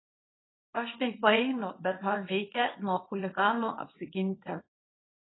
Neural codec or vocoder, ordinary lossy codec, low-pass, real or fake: codec, 24 kHz, 0.9 kbps, WavTokenizer, small release; AAC, 16 kbps; 7.2 kHz; fake